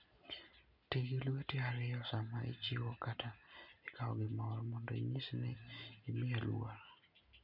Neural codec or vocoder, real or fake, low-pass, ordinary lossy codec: none; real; 5.4 kHz; none